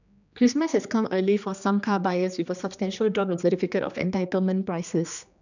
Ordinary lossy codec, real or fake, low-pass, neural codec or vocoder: none; fake; 7.2 kHz; codec, 16 kHz, 2 kbps, X-Codec, HuBERT features, trained on general audio